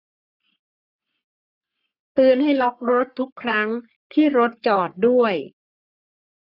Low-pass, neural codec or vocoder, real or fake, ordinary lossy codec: 5.4 kHz; codec, 44.1 kHz, 3.4 kbps, Pupu-Codec; fake; none